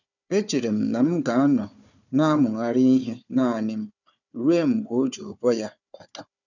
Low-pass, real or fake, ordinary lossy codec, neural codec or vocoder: 7.2 kHz; fake; none; codec, 16 kHz, 4 kbps, FunCodec, trained on Chinese and English, 50 frames a second